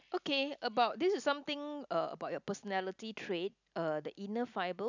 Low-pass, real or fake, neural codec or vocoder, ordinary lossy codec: 7.2 kHz; real; none; none